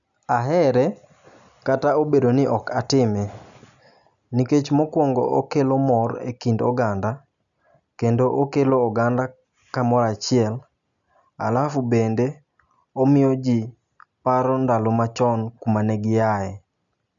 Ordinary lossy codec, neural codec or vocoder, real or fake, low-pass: none; none; real; 7.2 kHz